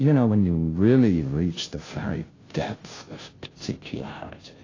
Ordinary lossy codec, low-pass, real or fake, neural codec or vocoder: AAC, 32 kbps; 7.2 kHz; fake; codec, 16 kHz, 0.5 kbps, FunCodec, trained on Chinese and English, 25 frames a second